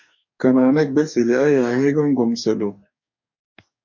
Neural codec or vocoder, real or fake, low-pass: codec, 44.1 kHz, 2.6 kbps, DAC; fake; 7.2 kHz